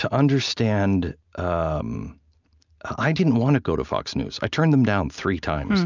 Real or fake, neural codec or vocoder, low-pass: real; none; 7.2 kHz